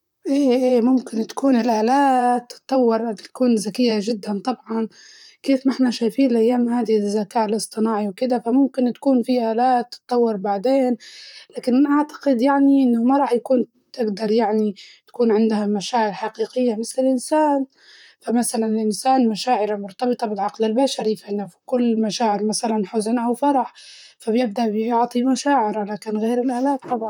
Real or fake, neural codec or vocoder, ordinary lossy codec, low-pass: fake; vocoder, 44.1 kHz, 128 mel bands, Pupu-Vocoder; none; 19.8 kHz